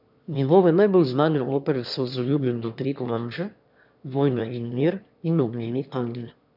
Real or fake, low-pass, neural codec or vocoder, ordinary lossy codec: fake; 5.4 kHz; autoencoder, 22.05 kHz, a latent of 192 numbers a frame, VITS, trained on one speaker; none